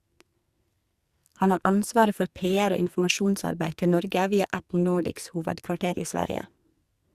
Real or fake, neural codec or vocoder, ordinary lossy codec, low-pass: fake; codec, 44.1 kHz, 2.6 kbps, SNAC; Opus, 64 kbps; 14.4 kHz